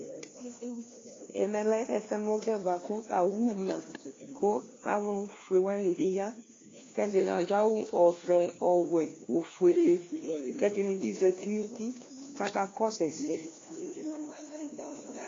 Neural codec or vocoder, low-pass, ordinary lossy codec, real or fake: codec, 16 kHz, 1 kbps, FunCodec, trained on LibriTTS, 50 frames a second; 7.2 kHz; AAC, 32 kbps; fake